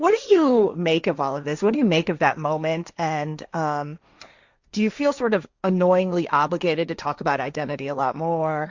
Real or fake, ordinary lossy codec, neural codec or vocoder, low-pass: fake; Opus, 64 kbps; codec, 16 kHz, 1.1 kbps, Voila-Tokenizer; 7.2 kHz